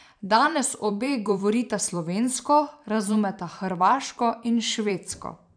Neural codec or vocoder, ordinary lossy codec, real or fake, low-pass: vocoder, 44.1 kHz, 128 mel bands every 512 samples, BigVGAN v2; none; fake; 9.9 kHz